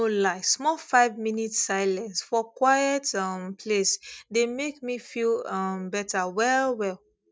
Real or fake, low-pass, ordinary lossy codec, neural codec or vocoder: real; none; none; none